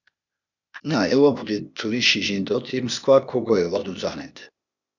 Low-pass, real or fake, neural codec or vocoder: 7.2 kHz; fake; codec, 16 kHz, 0.8 kbps, ZipCodec